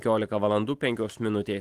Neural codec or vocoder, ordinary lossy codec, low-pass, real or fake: none; Opus, 24 kbps; 14.4 kHz; real